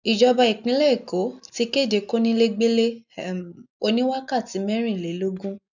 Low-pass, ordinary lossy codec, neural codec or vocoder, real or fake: 7.2 kHz; none; none; real